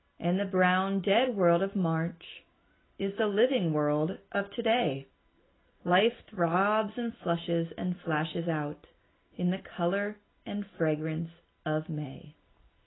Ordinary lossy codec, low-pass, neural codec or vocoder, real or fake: AAC, 16 kbps; 7.2 kHz; none; real